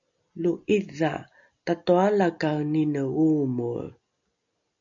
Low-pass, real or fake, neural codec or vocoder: 7.2 kHz; real; none